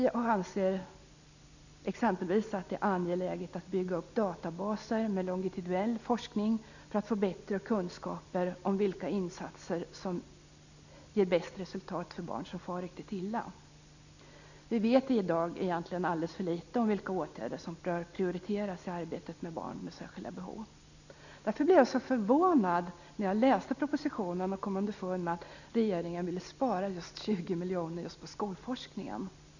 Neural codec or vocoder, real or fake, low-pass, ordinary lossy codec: none; real; 7.2 kHz; none